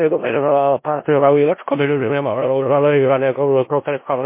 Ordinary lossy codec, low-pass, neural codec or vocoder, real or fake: MP3, 24 kbps; 3.6 kHz; codec, 16 kHz in and 24 kHz out, 0.4 kbps, LongCat-Audio-Codec, four codebook decoder; fake